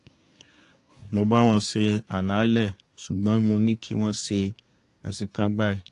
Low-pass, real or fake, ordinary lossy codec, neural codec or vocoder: 10.8 kHz; fake; AAC, 48 kbps; codec, 24 kHz, 1 kbps, SNAC